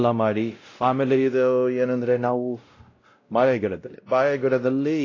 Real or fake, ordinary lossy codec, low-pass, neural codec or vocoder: fake; AAC, 32 kbps; 7.2 kHz; codec, 16 kHz, 0.5 kbps, X-Codec, WavLM features, trained on Multilingual LibriSpeech